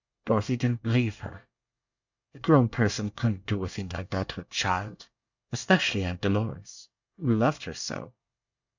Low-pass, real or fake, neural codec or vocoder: 7.2 kHz; fake; codec, 24 kHz, 1 kbps, SNAC